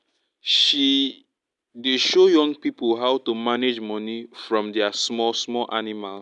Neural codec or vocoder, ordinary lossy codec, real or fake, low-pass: none; none; real; 10.8 kHz